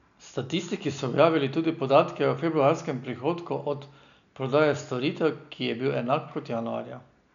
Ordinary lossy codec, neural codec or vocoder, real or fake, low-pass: none; none; real; 7.2 kHz